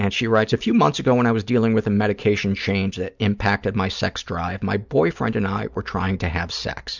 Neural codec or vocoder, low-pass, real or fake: none; 7.2 kHz; real